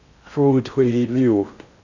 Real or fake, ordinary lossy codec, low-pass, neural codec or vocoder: fake; none; 7.2 kHz; codec, 16 kHz in and 24 kHz out, 0.6 kbps, FocalCodec, streaming, 4096 codes